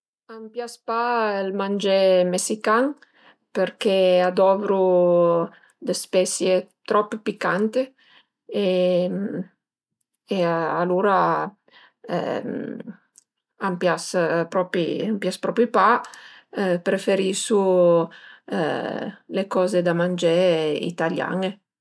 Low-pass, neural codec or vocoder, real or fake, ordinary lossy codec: none; none; real; none